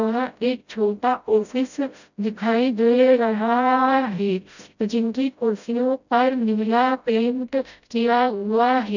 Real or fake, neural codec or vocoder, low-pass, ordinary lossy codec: fake; codec, 16 kHz, 0.5 kbps, FreqCodec, smaller model; 7.2 kHz; none